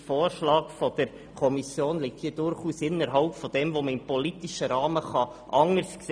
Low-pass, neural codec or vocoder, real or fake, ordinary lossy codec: 9.9 kHz; none; real; none